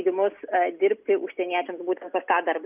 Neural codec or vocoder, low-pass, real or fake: none; 3.6 kHz; real